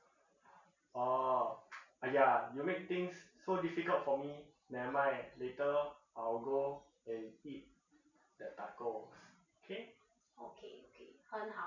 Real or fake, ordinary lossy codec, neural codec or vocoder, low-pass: real; none; none; 7.2 kHz